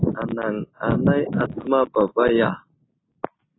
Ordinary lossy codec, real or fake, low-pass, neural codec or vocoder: AAC, 16 kbps; real; 7.2 kHz; none